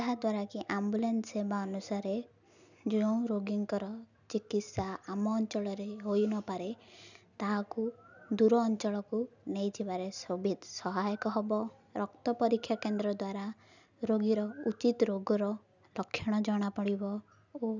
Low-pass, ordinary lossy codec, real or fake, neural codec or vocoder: 7.2 kHz; none; real; none